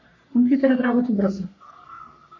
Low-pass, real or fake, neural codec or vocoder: 7.2 kHz; fake; codec, 44.1 kHz, 3.4 kbps, Pupu-Codec